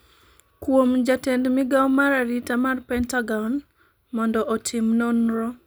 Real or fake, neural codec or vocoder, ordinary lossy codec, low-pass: real; none; none; none